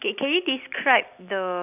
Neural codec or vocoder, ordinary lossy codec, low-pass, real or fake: none; none; 3.6 kHz; real